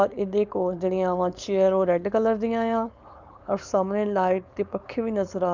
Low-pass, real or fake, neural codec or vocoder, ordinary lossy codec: 7.2 kHz; fake; codec, 16 kHz, 4.8 kbps, FACodec; none